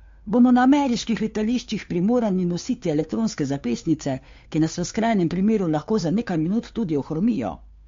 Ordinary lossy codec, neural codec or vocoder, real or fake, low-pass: MP3, 48 kbps; codec, 16 kHz, 2 kbps, FunCodec, trained on Chinese and English, 25 frames a second; fake; 7.2 kHz